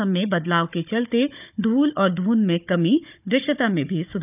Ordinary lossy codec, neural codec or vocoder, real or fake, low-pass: none; codec, 16 kHz, 16 kbps, FunCodec, trained on Chinese and English, 50 frames a second; fake; 3.6 kHz